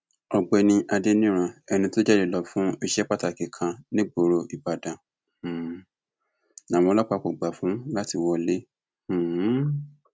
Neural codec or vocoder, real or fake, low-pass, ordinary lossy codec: none; real; none; none